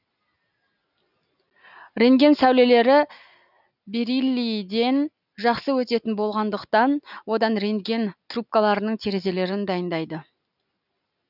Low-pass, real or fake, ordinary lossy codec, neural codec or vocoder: 5.4 kHz; real; none; none